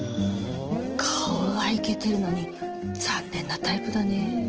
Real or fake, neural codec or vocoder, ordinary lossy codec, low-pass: real; none; Opus, 16 kbps; 7.2 kHz